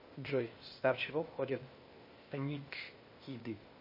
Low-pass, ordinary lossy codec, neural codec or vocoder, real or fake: 5.4 kHz; MP3, 24 kbps; codec, 16 kHz, 0.8 kbps, ZipCodec; fake